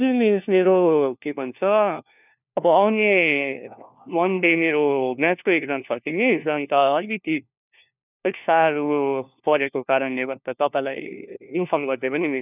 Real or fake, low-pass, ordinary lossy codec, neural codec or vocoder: fake; 3.6 kHz; none; codec, 16 kHz, 1 kbps, FunCodec, trained on LibriTTS, 50 frames a second